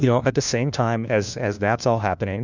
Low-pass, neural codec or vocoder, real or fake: 7.2 kHz; codec, 16 kHz, 1 kbps, FunCodec, trained on LibriTTS, 50 frames a second; fake